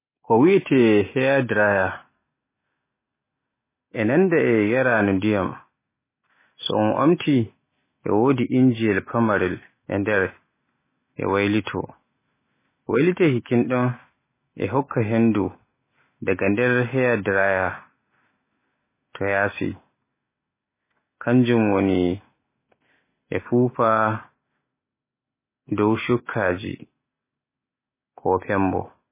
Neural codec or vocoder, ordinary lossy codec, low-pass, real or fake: none; MP3, 16 kbps; 3.6 kHz; real